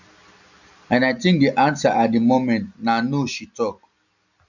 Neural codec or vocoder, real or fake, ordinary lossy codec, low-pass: none; real; none; 7.2 kHz